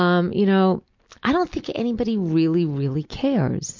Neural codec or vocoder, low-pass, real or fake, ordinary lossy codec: none; 7.2 kHz; real; MP3, 48 kbps